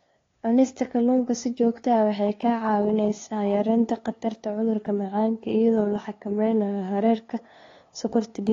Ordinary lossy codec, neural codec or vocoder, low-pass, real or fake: AAC, 32 kbps; codec, 16 kHz, 2 kbps, FunCodec, trained on LibriTTS, 25 frames a second; 7.2 kHz; fake